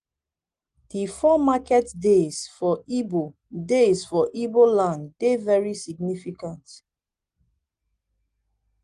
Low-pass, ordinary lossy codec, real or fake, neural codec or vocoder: 9.9 kHz; Opus, 24 kbps; real; none